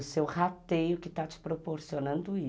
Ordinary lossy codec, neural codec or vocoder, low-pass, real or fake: none; none; none; real